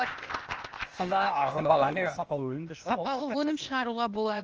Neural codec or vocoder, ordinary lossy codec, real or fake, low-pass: codec, 16 kHz, 0.8 kbps, ZipCodec; Opus, 24 kbps; fake; 7.2 kHz